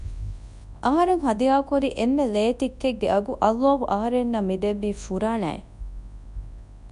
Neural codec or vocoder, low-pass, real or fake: codec, 24 kHz, 0.9 kbps, WavTokenizer, large speech release; 10.8 kHz; fake